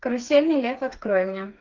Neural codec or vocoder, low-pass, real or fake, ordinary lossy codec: codec, 16 kHz, 4 kbps, FreqCodec, smaller model; 7.2 kHz; fake; Opus, 32 kbps